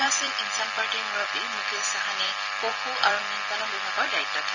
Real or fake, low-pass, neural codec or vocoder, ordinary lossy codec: fake; 7.2 kHz; vocoder, 44.1 kHz, 128 mel bands every 256 samples, BigVGAN v2; none